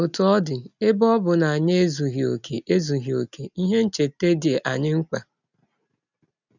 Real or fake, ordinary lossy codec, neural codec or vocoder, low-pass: real; none; none; 7.2 kHz